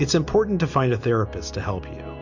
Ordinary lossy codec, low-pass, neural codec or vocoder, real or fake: MP3, 48 kbps; 7.2 kHz; none; real